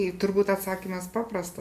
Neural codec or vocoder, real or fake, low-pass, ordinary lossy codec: none; real; 14.4 kHz; MP3, 96 kbps